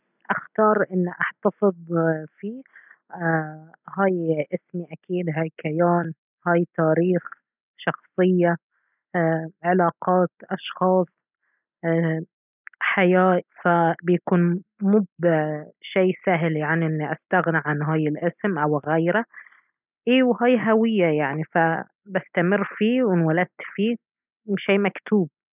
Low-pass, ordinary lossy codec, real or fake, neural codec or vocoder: 3.6 kHz; none; real; none